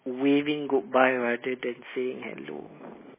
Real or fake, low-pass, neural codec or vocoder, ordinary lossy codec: real; 3.6 kHz; none; MP3, 16 kbps